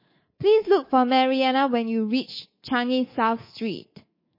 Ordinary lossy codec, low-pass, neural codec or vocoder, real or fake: MP3, 24 kbps; 5.4 kHz; codec, 24 kHz, 3.1 kbps, DualCodec; fake